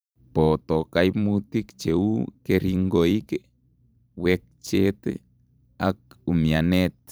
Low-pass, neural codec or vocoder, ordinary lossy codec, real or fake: none; vocoder, 44.1 kHz, 128 mel bands every 512 samples, BigVGAN v2; none; fake